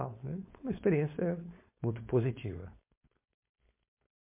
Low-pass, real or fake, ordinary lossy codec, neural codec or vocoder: 3.6 kHz; fake; MP3, 24 kbps; codec, 16 kHz, 4.8 kbps, FACodec